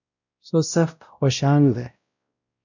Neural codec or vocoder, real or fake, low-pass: codec, 16 kHz, 0.5 kbps, X-Codec, WavLM features, trained on Multilingual LibriSpeech; fake; 7.2 kHz